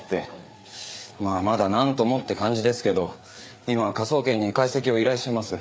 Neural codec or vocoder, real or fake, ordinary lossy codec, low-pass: codec, 16 kHz, 4 kbps, FreqCodec, larger model; fake; none; none